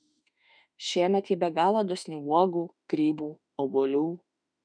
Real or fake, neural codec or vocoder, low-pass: fake; autoencoder, 48 kHz, 32 numbers a frame, DAC-VAE, trained on Japanese speech; 9.9 kHz